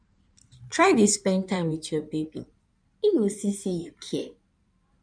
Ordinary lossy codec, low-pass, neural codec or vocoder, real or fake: none; 9.9 kHz; codec, 16 kHz in and 24 kHz out, 2.2 kbps, FireRedTTS-2 codec; fake